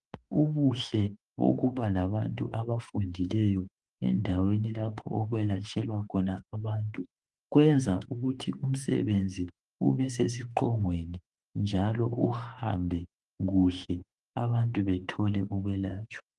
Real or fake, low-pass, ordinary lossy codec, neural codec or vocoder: fake; 10.8 kHz; Opus, 24 kbps; autoencoder, 48 kHz, 32 numbers a frame, DAC-VAE, trained on Japanese speech